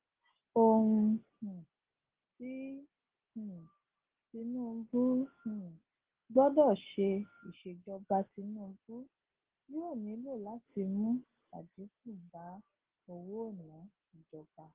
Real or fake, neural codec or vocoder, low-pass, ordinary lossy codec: real; none; 3.6 kHz; Opus, 16 kbps